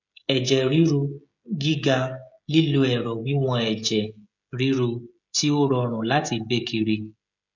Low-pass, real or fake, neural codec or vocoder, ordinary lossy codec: 7.2 kHz; fake; codec, 16 kHz, 16 kbps, FreqCodec, smaller model; none